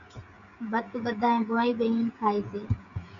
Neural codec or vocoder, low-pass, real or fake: codec, 16 kHz, 16 kbps, FreqCodec, smaller model; 7.2 kHz; fake